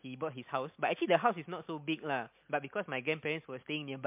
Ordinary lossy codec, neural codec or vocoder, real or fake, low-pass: MP3, 32 kbps; none; real; 3.6 kHz